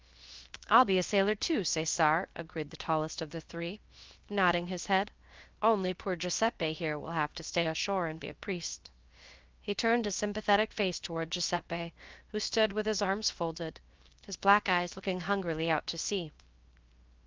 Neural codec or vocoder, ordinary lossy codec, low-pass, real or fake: codec, 16 kHz, 0.7 kbps, FocalCodec; Opus, 24 kbps; 7.2 kHz; fake